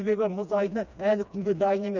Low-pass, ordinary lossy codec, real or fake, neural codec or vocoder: 7.2 kHz; none; fake; codec, 16 kHz, 2 kbps, FreqCodec, smaller model